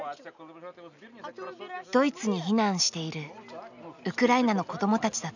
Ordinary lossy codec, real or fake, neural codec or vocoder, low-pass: none; real; none; 7.2 kHz